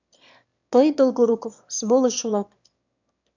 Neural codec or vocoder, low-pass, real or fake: autoencoder, 22.05 kHz, a latent of 192 numbers a frame, VITS, trained on one speaker; 7.2 kHz; fake